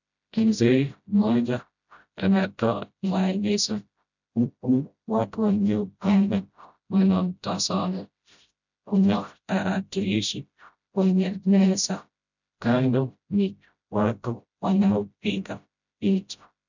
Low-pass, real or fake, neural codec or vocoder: 7.2 kHz; fake; codec, 16 kHz, 0.5 kbps, FreqCodec, smaller model